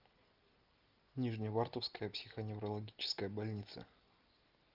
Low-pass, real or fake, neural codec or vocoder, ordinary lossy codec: 5.4 kHz; real; none; Opus, 32 kbps